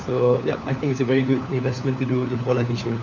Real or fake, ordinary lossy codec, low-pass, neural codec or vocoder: fake; none; 7.2 kHz; codec, 16 kHz, 4 kbps, FunCodec, trained on LibriTTS, 50 frames a second